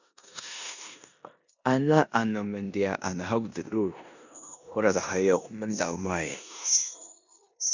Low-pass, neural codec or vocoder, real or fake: 7.2 kHz; codec, 16 kHz in and 24 kHz out, 0.9 kbps, LongCat-Audio-Codec, four codebook decoder; fake